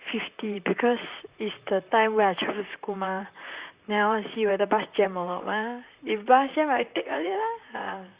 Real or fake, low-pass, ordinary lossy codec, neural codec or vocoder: fake; 3.6 kHz; Opus, 64 kbps; vocoder, 44.1 kHz, 128 mel bands, Pupu-Vocoder